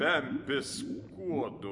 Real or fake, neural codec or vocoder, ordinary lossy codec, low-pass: real; none; MP3, 48 kbps; 10.8 kHz